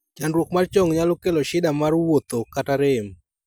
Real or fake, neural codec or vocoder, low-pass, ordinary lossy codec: real; none; none; none